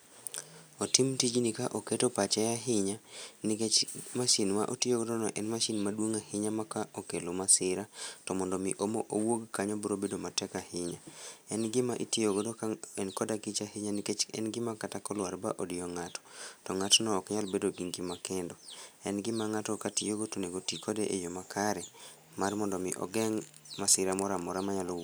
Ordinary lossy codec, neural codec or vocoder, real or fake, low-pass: none; none; real; none